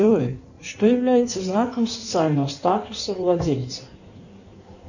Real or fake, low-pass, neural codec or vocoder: fake; 7.2 kHz; codec, 16 kHz in and 24 kHz out, 1.1 kbps, FireRedTTS-2 codec